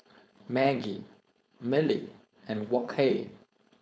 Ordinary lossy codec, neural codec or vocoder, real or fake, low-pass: none; codec, 16 kHz, 4.8 kbps, FACodec; fake; none